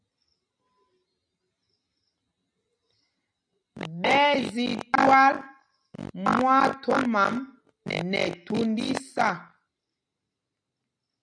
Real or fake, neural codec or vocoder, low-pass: real; none; 9.9 kHz